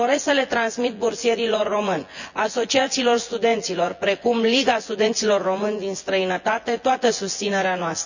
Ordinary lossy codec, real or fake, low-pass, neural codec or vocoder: none; fake; 7.2 kHz; vocoder, 24 kHz, 100 mel bands, Vocos